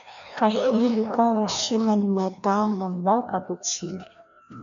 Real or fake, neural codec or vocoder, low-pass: fake; codec, 16 kHz, 1 kbps, FreqCodec, larger model; 7.2 kHz